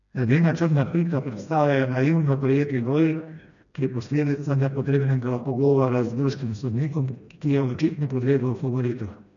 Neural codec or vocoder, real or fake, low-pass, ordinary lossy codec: codec, 16 kHz, 1 kbps, FreqCodec, smaller model; fake; 7.2 kHz; MP3, 96 kbps